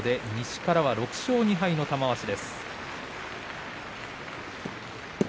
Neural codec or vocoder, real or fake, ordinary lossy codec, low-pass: none; real; none; none